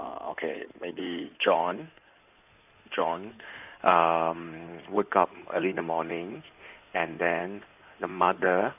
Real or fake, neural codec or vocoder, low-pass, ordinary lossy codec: fake; codec, 16 kHz in and 24 kHz out, 2.2 kbps, FireRedTTS-2 codec; 3.6 kHz; none